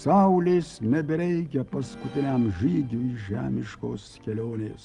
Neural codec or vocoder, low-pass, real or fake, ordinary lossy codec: none; 10.8 kHz; real; Opus, 32 kbps